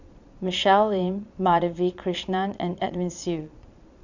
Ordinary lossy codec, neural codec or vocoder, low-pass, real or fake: none; vocoder, 22.05 kHz, 80 mel bands, Vocos; 7.2 kHz; fake